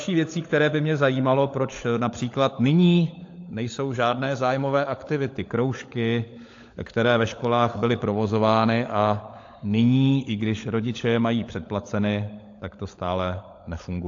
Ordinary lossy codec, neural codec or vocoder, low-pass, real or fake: AAC, 48 kbps; codec, 16 kHz, 16 kbps, FunCodec, trained on LibriTTS, 50 frames a second; 7.2 kHz; fake